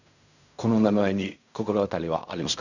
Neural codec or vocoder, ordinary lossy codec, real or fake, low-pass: codec, 16 kHz in and 24 kHz out, 0.4 kbps, LongCat-Audio-Codec, fine tuned four codebook decoder; none; fake; 7.2 kHz